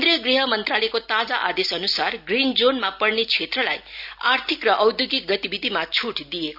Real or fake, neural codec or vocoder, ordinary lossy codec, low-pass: real; none; none; 5.4 kHz